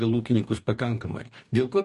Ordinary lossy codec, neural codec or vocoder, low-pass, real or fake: MP3, 48 kbps; codec, 44.1 kHz, 2.6 kbps, DAC; 14.4 kHz; fake